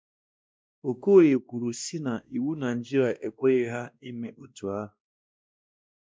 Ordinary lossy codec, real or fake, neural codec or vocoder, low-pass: none; fake; codec, 16 kHz, 1 kbps, X-Codec, WavLM features, trained on Multilingual LibriSpeech; none